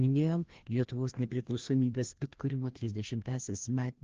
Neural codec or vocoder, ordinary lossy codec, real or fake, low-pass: codec, 16 kHz, 1 kbps, FreqCodec, larger model; Opus, 16 kbps; fake; 7.2 kHz